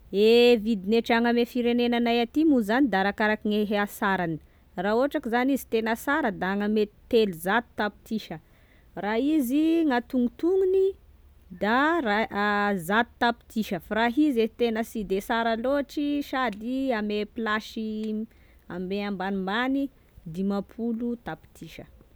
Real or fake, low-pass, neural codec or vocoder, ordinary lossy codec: real; none; none; none